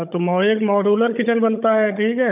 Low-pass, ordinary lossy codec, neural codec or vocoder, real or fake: 3.6 kHz; none; codec, 16 kHz, 16 kbps, FunCodec, trained on Chinese and English, 50 frames a second; fake